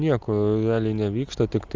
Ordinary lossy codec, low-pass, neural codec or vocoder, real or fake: Opus, 32 kbps; 7.2 kHz; none; real